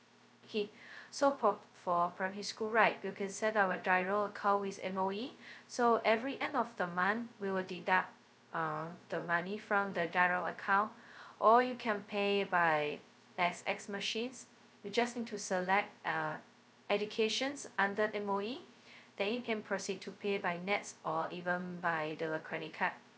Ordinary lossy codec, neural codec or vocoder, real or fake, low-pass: none; codec, 16 kHz, 0.2 kbps, FocalCodec; fake; none